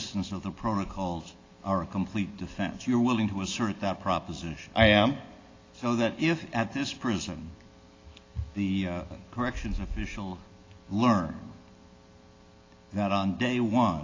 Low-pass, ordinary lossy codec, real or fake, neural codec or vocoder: 7.2 kHz; AAC, 32 kbps; real; none